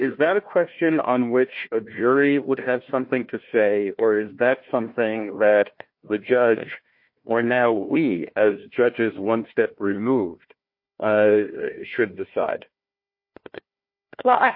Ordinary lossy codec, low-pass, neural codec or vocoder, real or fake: MP3, 32 kbps; 5.4 kHz; codec, 16 kHz, 1 kbps, FunCodec, trained on Chinese and English, 50 frames a second; fake